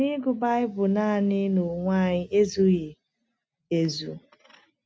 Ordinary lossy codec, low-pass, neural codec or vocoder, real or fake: none; none; none; real